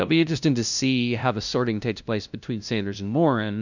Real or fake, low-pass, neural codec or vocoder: fake; 7.2 kHz; codec, 16 kHz, 0.5 kbps, FunCodec, trained on LibriTTS, 25 frames a second